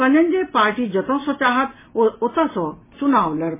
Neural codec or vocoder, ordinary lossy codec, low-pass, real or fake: none; MP3, 16 kbps; 3.6 kHz; real